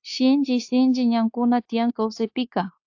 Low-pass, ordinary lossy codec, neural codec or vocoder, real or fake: 7.2 kHz; AAC, 48 kbps; codec, 16 kHz, 0.9 kbps, LongCat-Audio-Codec; fake